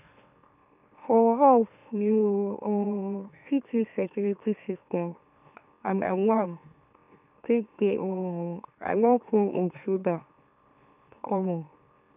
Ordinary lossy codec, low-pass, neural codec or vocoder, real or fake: none; 3.6 kHz; autoencoder, 44.1 kHz, a latent of 192 numbers a frame, MeloTTS; fake